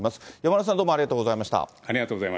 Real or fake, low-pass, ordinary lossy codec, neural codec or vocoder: real; none; none; none